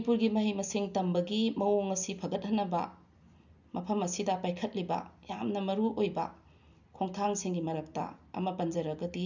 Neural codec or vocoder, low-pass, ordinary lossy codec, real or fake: none; 7.2 kHz; none; real